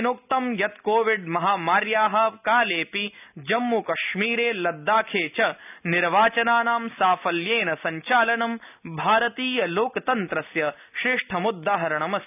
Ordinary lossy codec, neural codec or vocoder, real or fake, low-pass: none; none; real; 3.6 kHz